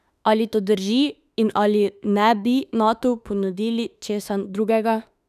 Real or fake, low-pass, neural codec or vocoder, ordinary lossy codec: fake; 14.4 kHz; autoencoder, 48 kHz, 32 numbers a frame, DAC-VAE, trained on Japanese speech; none